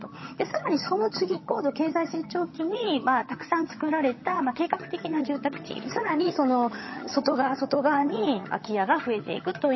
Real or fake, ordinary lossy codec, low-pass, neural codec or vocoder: fake; MP3, 24 kbps; 7.2 kHz; vocoder, 22.05 kHz, 80 mel bands, HiFi-GAN